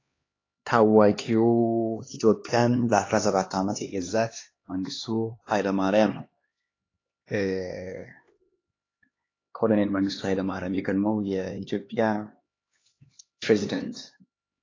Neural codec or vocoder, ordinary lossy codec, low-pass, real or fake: codec, 16 kHz, 2 kbps, X-Codec, HuBERT features, trained on LibriSpeech; AAC, 32 kbps; 7.2 kHz; fake